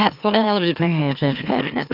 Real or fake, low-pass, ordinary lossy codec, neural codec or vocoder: fake; 5.4 kHz; none; autoencoder, 44.1 kHz, a latent of 192 numbers a frame, MeloTTS